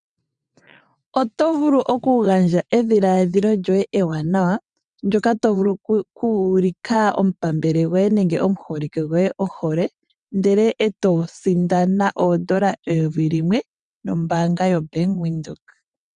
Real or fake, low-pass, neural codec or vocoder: fake; 9.9 kHz; vocoder, 22.05 kHz, 80 mel bands, WaveNeXt